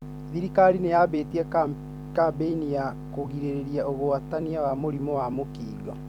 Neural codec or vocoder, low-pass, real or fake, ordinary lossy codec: vocoder, 44.1 kHz, 128 mel bands every 256 samples, BigVGAN v2; 19.8 kHz; fake; none